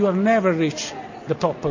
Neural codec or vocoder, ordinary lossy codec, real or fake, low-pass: none; MP3, 48 kbps; real; 7.2 kHz